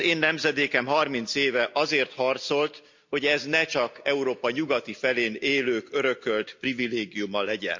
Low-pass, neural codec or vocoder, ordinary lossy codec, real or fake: 7.2 kHz; none; MP3, 64 kbps; real